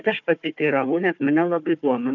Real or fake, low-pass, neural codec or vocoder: fake; 7.2 kHz; codec, 16 kHz, 4 kbps, FunCodec, trained on Chinese and English, 50 frames a second